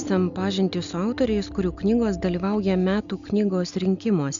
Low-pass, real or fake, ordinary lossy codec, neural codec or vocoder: 7.2 kHz; real; Opus, 64 kbps; none